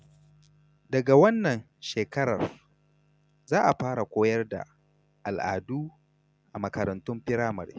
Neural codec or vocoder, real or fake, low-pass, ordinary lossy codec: none; real; none; none